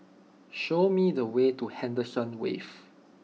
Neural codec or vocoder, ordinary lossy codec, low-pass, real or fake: none; none; none; real